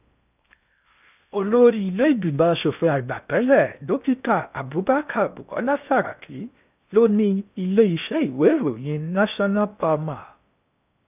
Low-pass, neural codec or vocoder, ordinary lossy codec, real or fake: 3.6 kHz; codec, 16 kHz in and 24 kHz out, 0.6 kbps, FocalCodec, streaming, 4096 codes; none; fake